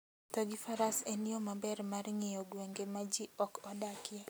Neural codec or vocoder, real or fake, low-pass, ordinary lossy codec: none; real; none; none